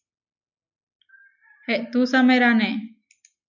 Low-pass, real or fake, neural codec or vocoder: 7.2 kHz; real; none